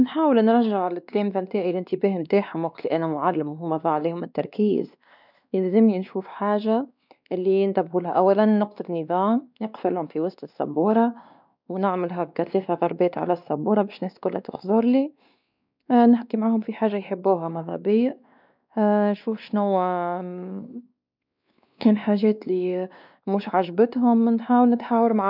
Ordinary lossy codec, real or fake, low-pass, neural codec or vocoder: none; fake; 5.4 kHz; codec, 16 kHz, 2 kbps, X-Codec, WavLM features, trained on Multilingual LibriSpeech